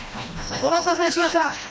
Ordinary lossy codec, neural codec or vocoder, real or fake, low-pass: none; codec, 16 kHz, 1 kbps, FreqCodec, smaller model; fake; none